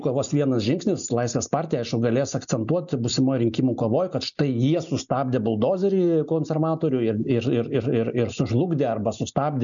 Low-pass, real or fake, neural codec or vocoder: 7.2 kHz; real; none